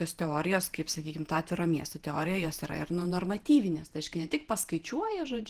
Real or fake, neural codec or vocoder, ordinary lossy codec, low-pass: fake; vocoder, 48 kHz, 128 mel bands, Vocos; Opus, 16 kbps; 14.4 kHz